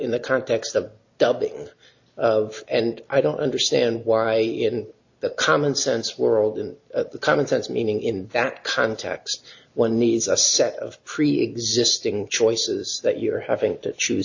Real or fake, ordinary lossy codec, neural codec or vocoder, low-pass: real; AAC, 48 kbps; none; 7.2 kHz